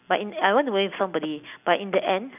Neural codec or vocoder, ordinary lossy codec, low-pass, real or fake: none; none; 3.6 kHz; real